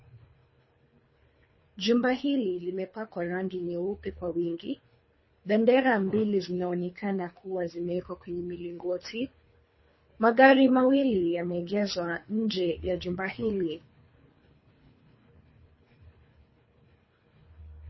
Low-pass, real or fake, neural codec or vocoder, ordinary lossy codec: 7.2 kHz; fake; codec, 24 kHz, 3 kbps, HILCodec; MP3, 24 kbps